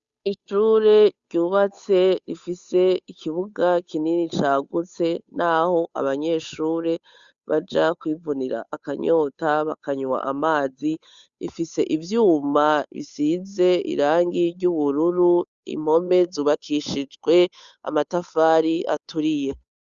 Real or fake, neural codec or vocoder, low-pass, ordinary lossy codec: fake; codec, 16 kHz, 8 kbps, FunCodec, trained on Chinese and English, 25 frames a second; 7.2 kHz; Opus, 64 kbps